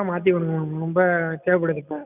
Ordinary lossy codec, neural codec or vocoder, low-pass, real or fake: none; none; 3.6 kHz; real